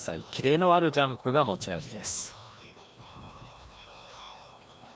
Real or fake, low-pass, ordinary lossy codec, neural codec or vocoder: fake; none; none; codec, 16 kHz, 1 kbps, FreqCodec, larger model